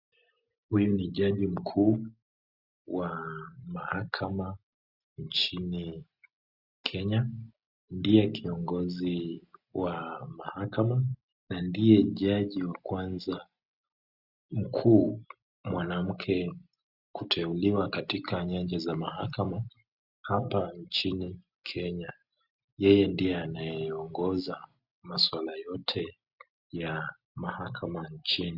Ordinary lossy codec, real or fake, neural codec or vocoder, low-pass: Opus, 64 kbps; real; none; 5.4 kHz